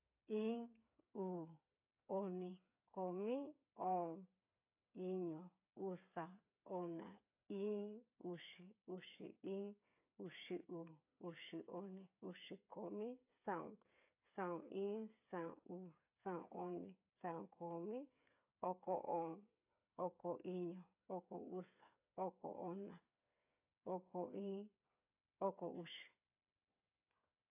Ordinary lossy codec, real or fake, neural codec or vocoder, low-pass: AAC, 32 kbps; fake; codec, 16 kHz, 8 kbps, FreqCodec, smaller model; 3.6 kHz